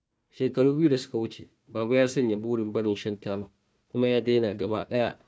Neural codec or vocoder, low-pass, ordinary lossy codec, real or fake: codec, 16 kHz, 1 kbps, FunCodec, trained on Chinese and English, 50 frames a second; none; none; fake